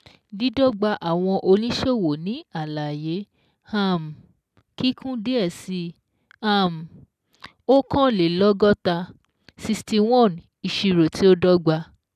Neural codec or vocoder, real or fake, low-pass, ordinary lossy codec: none; real; 14.4 kHz; none